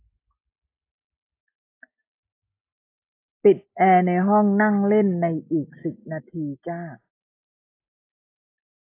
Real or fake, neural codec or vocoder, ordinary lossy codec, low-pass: real; none; AAC, 24 kbps; 3.6 kHz